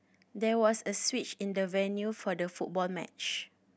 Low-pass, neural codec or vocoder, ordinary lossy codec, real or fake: none; none; none; real